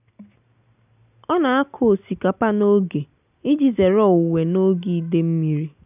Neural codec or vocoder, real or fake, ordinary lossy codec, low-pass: none; real; none; 3.6 kHz